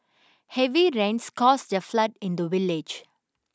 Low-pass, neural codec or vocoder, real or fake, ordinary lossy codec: none; none; real; none